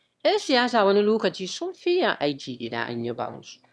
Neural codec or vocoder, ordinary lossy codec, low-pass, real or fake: autoencoder, 22.05 kHz, a latent of 192 numbers a frame, VITS, trained on one speaker; none; none; fake